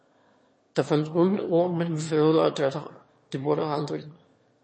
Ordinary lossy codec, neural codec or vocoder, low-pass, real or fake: MP3, 32 kbps; autoencoder, 22.05 kHz, a latent of 192 numbers a frame, VITS, trained on one speaker; 9.9 kHz; fake